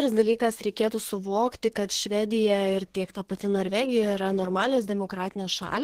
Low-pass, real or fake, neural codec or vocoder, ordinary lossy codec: 14.4 kHz; fake; codec, 32 kHz, 1.9 kbps, SNAC; Opus, 16 kbps